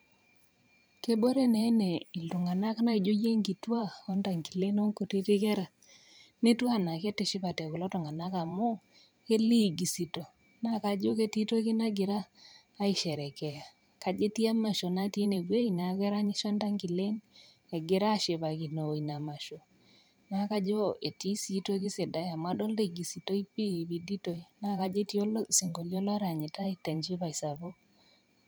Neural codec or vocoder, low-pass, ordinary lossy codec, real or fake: vocoder, 44.1 kHz, 128 mel bands every 512 samples, BigVGAN v2; none; none; fake